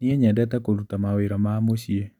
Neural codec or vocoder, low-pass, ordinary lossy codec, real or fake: none; 19.8 kHz; none; real